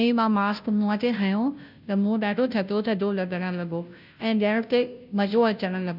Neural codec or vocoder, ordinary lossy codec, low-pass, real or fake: codec, 16 kHz, 0.5 kbps, FunCodec, trained on Chinese and English, 25 frames a second; none; 5.4 kHz; fake